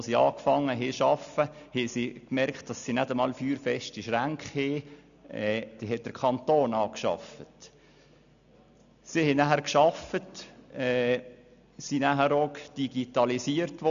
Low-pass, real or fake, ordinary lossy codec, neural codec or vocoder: 7.2 kHz; real; none; none